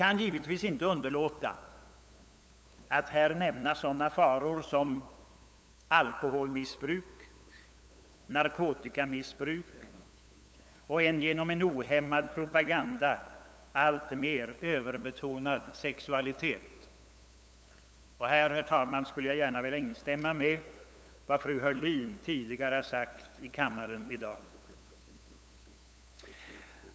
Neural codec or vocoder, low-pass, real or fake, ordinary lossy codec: codec, 16 kHz, 8 kbps, FunCodec, trained on LibriTTS, 25 frames a second; none; fake; none